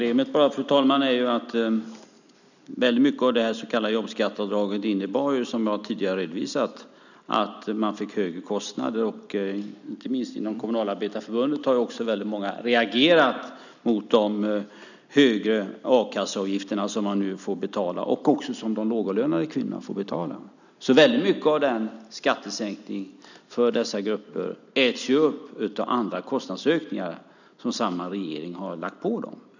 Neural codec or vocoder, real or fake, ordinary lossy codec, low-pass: none; real; none; 7.2 kHz